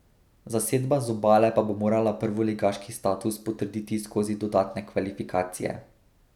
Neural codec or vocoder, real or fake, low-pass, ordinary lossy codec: none; real; 19.8 kHz; none